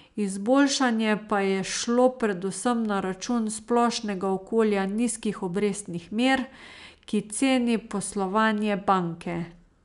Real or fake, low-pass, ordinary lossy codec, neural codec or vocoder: real; 10.8 kHz; none; none